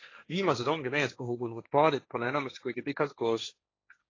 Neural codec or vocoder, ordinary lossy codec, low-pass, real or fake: codec, 16 kHz, 1.1 kbps, Voila-Tokenizer; AAC, 32 kbps; 7.2 kHz; fake